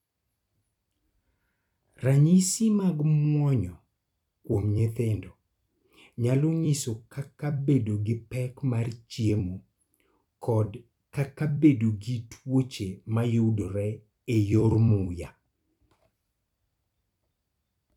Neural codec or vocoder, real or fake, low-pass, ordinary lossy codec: vocoder, 44.1 kHz, 128 mel bands every 256 samples, BigVGAN v2; fake; 19.8 kHz; none